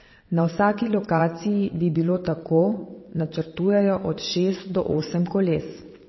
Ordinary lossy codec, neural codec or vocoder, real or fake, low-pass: MP3, 24 kbps; vocoder, 22.05 kHz, 80 mel bands, WaveNeXt; fake; 7.2 kHz